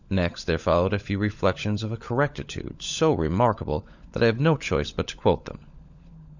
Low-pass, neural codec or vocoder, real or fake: 7.2 kHz; codec, 16 kHz, 16 kbps, FunCodec, trained on LibriTTS, 50 frames a second; fake